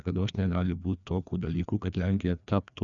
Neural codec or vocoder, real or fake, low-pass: codec, 16 kHz, 2 kbps, FreqCodec, larger model; fake; 7.2 kHz